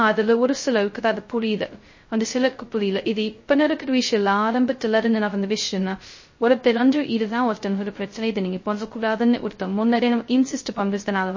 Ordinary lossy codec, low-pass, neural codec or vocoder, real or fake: MP3, 32 kbps; 7.2 kHz; codec, 16 kHz, 0.2 kbps, FocalCodec; fake